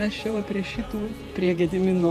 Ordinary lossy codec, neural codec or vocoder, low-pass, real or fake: Opus, 64 kbps; vocoder, 48 kHz, 128 mel bands, Vocos; 14.4 kHz; fake